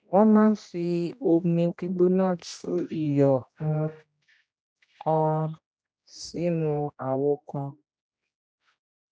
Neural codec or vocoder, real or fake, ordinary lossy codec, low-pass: codec, 16 kHz, 1 kbps, X-Codec, HuBERT features, trained on general audio; fake; none; none